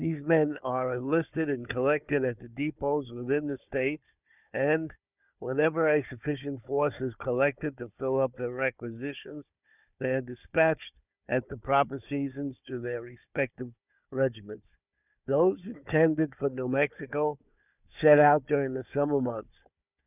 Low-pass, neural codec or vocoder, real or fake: 3.6 kHz; codec, 16 kHz, 4 kbps, FunCodec, trained on Chinese and English, 50 frames a second; fake